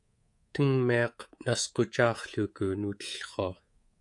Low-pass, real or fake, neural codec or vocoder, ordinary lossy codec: 10.8 kHz; fake; codec, 24 kHz, 3.1 kbps, DualCodec; AAC, 64 kbps